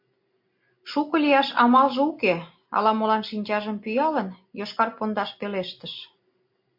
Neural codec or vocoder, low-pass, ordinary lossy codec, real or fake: none; 5.4 kHz; MP3, 32 kbps; real